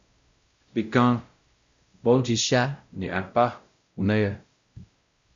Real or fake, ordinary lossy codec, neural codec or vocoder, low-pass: fake; Opus, 64 kbps; codec, 16 kHz, 0.5 kbps, X-Codec, WavLM features, trained on Multilingual LibriSpeech; 7.2 kHz